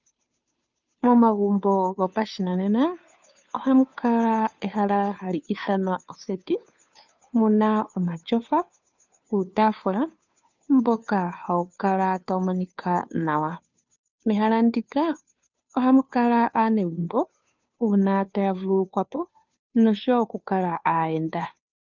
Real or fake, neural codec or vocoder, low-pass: fake; codec, 16 kHz, 2 kbps, FunCodec, trained on Chinese and English, 25 frames a second; 7.2 kHz